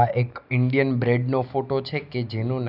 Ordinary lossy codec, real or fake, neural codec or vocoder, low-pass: none; real; none; 5.4 kHz